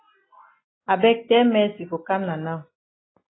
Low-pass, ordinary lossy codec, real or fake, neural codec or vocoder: 7.2 kHz; AAC, 16 kbps; real; none